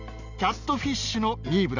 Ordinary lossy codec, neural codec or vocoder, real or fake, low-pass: none; none; real; 7.2 kHz